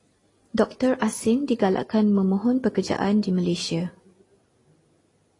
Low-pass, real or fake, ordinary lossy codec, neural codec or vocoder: 10.8 kHz; real; AAC, 32 kbps; none